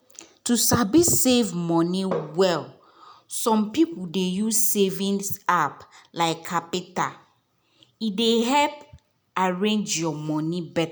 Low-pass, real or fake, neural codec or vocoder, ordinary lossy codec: none; real; none; none